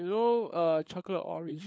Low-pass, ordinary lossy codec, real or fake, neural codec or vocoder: none; none; fake; codec, 16 kHz, 4 kbps, FunCodec, trained on LibriTTS, 50 frames a second